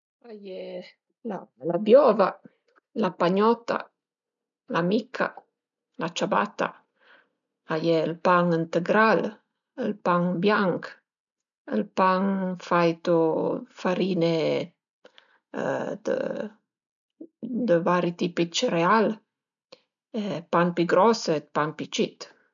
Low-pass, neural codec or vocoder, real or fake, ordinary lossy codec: 7.2 kHz; none; real; none